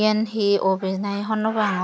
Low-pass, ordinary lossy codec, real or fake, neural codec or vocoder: none; none; real; none